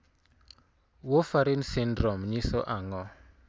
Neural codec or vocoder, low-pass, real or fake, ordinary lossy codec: none; none; real; none